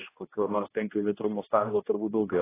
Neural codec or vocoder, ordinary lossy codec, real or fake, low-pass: codec, 16 kHz, 1 kbps, X-Codec, HuBERT features, trained on general audio; AAC, 16 kbps; fake; 3.6 kHz